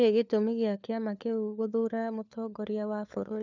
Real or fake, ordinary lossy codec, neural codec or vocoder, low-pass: fake; none; codec, 16 kHz, 4 kbps, FunCodec, trained on LibriTTS, 50 frames a second; 7.2 kHz